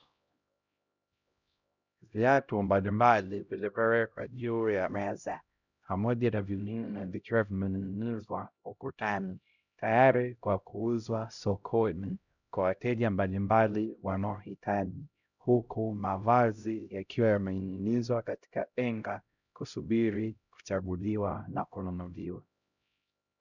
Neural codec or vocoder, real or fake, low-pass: codec, 16 kHz, 0.5 kbps, X-Codec, HuBERT features, trained on LibriSpeech; fake; 7.2 kHz